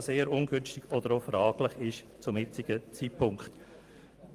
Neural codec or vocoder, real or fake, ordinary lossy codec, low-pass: vocoder, 44.1 kHz, 128 mel bands every 512 samples, BigVGAN v2; fake; Opus, 32 kbps; 14.4 kHz